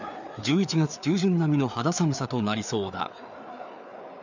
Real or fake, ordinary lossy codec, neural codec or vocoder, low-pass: fake; none; codec, 16 kHz, 4 kbps, FreqCodec, larger model; 7.2 kHz